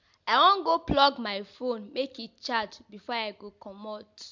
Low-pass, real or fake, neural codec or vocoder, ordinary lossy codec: 7.2 kHz; real; none; MP3, 64 kbps